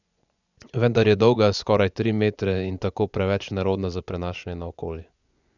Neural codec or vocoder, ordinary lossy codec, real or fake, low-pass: vocoder, 24 kHz, 100 mel bands, Vocos; none; fake; 7.2 kHz